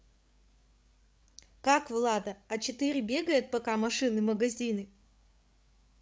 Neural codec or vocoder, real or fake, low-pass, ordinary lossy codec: codec, 16 kHz, 8 kbps, FreqCodec, larger model; fake; none; none